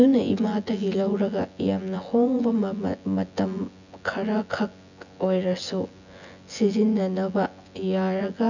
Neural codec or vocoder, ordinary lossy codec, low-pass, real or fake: vocoder, 24 kHz, 100 mel bands, Vocos; none; 7.2 kHz; fake